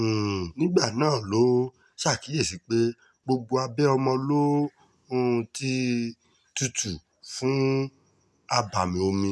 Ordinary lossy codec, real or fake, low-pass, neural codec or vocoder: none; real; none; none